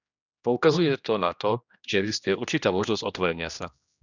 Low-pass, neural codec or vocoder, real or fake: 7.2 kHz; codec, 16 kHz, 1 kbps, X-Codec, HuBERT features, trained on general audio; fake